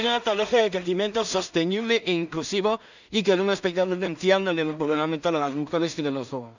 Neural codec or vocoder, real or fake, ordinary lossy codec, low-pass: codec, 16 kHz in and 24 kHz out, 0.4 kbps, LongCat-Audio-Codec, two codebook decoder; fake; none; 7.2 kHz